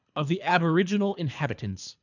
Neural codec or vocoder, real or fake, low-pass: codec, 24 kHz, 3 kbps, HILCodec; fake; 7.2 kHz